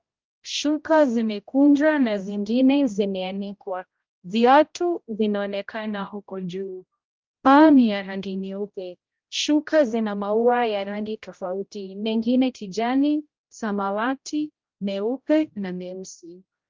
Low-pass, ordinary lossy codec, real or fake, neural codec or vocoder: 7.2 kHz; Opus, 32 kbps; fake; codec, 16 kHz, 0.5 kbps, X-Codec, HuBERT features, trained on general audio